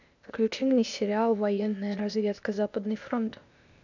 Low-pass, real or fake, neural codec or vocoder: 7.2 kHz; fake; codec, 16 kHz, 0.8 kbps, ZipCodec